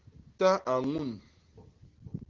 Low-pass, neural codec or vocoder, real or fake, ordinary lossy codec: 7.2 kHz; none; real; Opus, 16 kbps